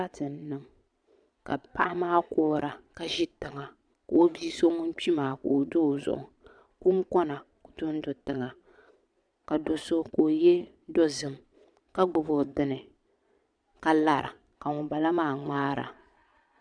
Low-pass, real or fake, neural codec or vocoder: 9.9 kHz; fake; vocoder, 22.05 kHz, 80 mel bands, Vocos